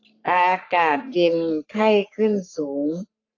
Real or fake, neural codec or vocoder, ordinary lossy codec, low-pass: fake; codec, 44.1 kHz, 3.4 kbps, Pupu-Codec; AAC, 48 kbps; 7.2 kHz